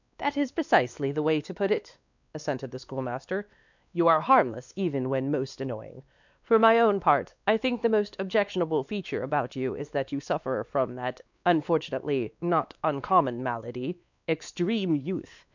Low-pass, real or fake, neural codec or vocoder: 7.2 kHz; fake; codec, 16 kHz, 2 kbps, X-Codec, WavLM features, trained on Multilingual LibriSpeech